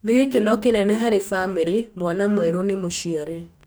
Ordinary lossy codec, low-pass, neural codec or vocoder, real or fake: none; none; codec, 44.1 kHz, 2.6 kbps, DAC; fake